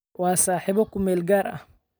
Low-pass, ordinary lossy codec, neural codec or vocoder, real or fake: none; none; none; real